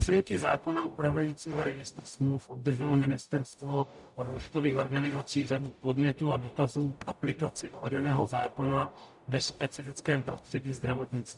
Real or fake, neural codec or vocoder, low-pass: fake; codec, 44.1 kHz, 0.9 kbps, DAC; 10.8 kHz